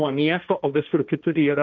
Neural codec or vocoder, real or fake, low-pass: codec, 16 kHz, 1.1 kbps, Voila-Tokenizer; fake; 7.2 kHz